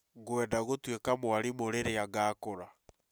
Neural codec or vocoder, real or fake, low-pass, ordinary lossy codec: none; real; none; none